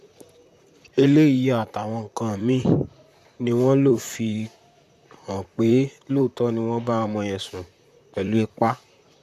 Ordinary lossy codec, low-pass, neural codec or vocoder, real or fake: none; 14.4 kHz; vocoder, 44.1 kHz, 128 mel bands, Pupu-Vocoder; fake